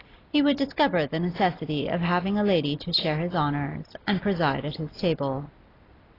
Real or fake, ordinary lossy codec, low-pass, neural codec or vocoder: real; AAC, 24 kbps; 5.4 kHz; none